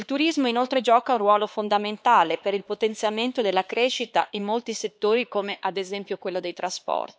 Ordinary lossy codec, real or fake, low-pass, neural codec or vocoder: none; fake; none; codec, 16 kHz, 4 kbps, X-Codec, HuBERT features, trained on LibriSpeech